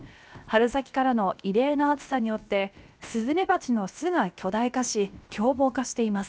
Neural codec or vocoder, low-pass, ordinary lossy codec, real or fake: codec, 16 kHz, 0.7 kbps, FocalCodec; none; none; fake